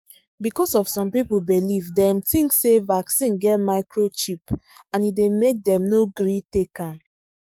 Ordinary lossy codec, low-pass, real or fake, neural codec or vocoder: none; 19.8 kHz; fake; codec, 44.1 kHz, 7.8 kbps, DAC